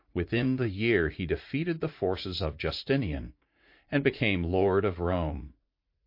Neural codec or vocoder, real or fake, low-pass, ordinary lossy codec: vocoder, 44.1 kHz, 80 mel bands, Vocos; fake; 5.4 kHz; MP3, 32 kbps